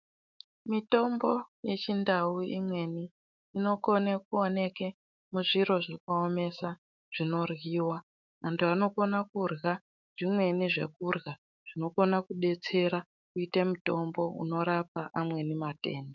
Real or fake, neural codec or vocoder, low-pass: fake; autoencoder, 48 kHz, 128 numbers a frame, DAC-VAE, trained on Japanese speech; 7.2 kHz